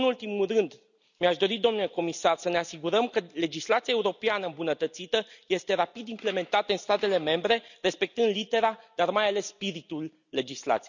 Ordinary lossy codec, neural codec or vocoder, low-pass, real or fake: none; none; 7.2 kHz; real